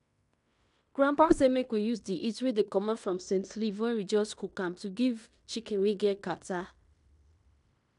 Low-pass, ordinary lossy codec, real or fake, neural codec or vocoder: 10.8 kHz; none; fake; codec, 16 kHz in and 24 kHz out, 0.9 kbps, LongCat-Audio-Codec, fine tuned four codebook decoder